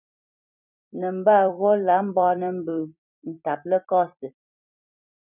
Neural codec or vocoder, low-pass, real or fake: vocoder, 44.1 kHz, 128 mel bands every 256 samples, BigVGAN v2; 3.6 kHz; fake